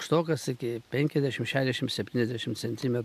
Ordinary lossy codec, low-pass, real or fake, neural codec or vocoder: MP3, 96 kbps; 14.4 kHz; real; none